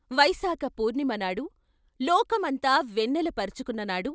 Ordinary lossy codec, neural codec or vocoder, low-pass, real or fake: none; none; none; real